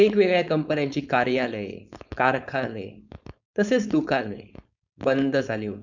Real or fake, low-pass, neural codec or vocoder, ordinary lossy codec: fake; 7.2 kHz; codec, 16 kHz, 4.8 kbps, FACodec; none